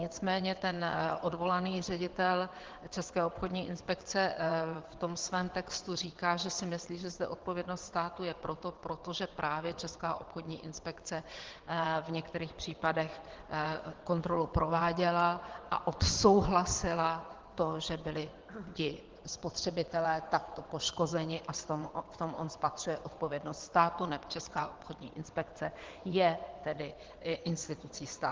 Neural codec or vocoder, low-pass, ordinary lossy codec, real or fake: vocoder, 22.05 kHz, 80 mel bands, WaveNeXt; 7.2 kHz; Opus, 16 kbps; fake